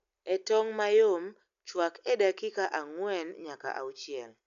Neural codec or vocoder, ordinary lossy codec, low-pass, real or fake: none; none; 7.2 kHz; real